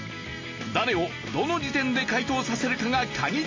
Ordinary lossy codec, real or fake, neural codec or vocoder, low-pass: MP3, 32 kbps; real; none; 7.2 kHz